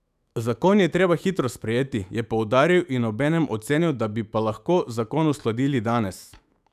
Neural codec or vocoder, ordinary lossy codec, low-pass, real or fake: autoencoder, 48 kHz, 128 numbers a frame, DAC-VAE, trained on Japanese speech; none; 14.4 kHz; fake